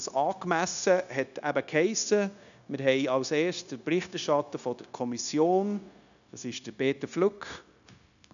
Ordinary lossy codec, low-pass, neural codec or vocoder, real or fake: none; 7.2 kHz; codec, 16 kHz, 0.9 kbps, LongCat-Audio-Codec; fake